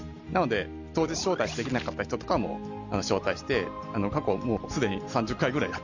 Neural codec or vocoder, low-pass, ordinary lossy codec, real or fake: none; 7.2 kHz; none; real